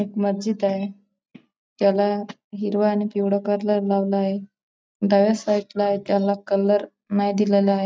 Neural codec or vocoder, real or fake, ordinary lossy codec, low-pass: none; real; none; none